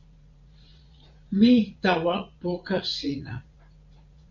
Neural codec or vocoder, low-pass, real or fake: vocoder, 44.1 kHz, 80 mel bands, Vocos; 7.2 kHz; fake